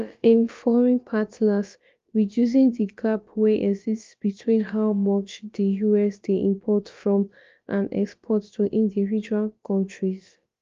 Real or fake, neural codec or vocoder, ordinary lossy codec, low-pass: fake; codec, 16 kHz, about 1 kbps, DyCAST, with the encoder's durations; Opus, 32 kbps; 7.2 kHz